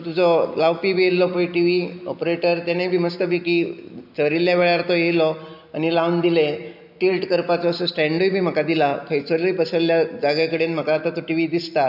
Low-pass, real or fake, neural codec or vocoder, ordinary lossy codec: 5.4 kHz; real; none; none